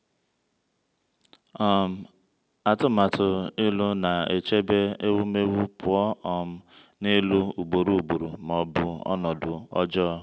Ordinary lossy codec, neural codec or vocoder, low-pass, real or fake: none; none; none; real